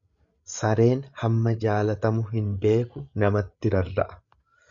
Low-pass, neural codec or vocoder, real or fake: 7.2 kHz; codec, 16 kHz, 8 kbps, FreqCodec, larger model; fake